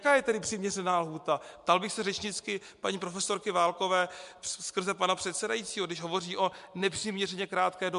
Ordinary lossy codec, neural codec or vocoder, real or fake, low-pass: MP3, 64 kbps; none; real; 10.8 kHz